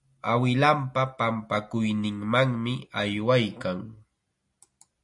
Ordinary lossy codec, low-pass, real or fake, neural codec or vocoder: MP3, 48 kbps; 10.8 kHz; real; none